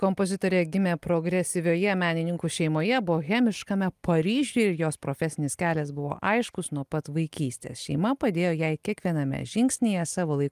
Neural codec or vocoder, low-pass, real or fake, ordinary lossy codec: none; 14.4 kHz; real; Opus, 32 kbps